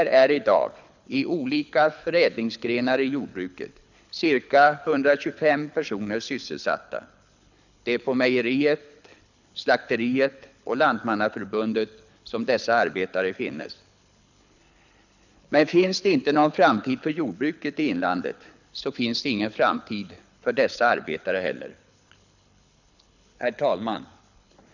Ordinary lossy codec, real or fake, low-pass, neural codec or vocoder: none; fake; 7.2 kHz; codec, 24 kHz, 6 kbps, HILCodec